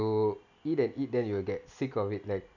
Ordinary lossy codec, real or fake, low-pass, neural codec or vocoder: none; real; 7.2 kHz; none